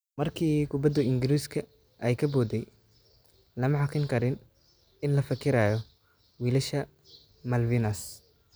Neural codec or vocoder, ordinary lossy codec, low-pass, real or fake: none; none; none; real